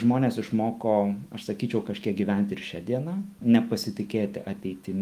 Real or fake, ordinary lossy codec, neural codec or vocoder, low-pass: fake; Opus, 32 kbps; autoencoder, 48 kHz, 128 numbers a frame, DAC-VAE, trained on Japanese speech; 14.4 kHz